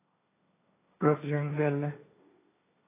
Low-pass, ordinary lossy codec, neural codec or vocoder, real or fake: 3.6 kHz; AAC, 16 kbps; codec, 16 kHz, 1.1 kbps, Voila-Tokenizer; fake